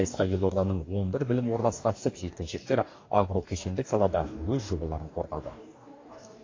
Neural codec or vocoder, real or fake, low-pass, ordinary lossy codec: codec, 44.1 kHz, 2.6 kbps, DAC; fake; 7.2 kHz; AAC, 32 kbps